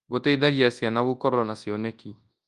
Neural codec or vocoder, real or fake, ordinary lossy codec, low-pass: codec, 24 kHz, 0.9 kbps, WavTokenizer, large speech release; fake; Opus, 32 kbps; 10.8 kHz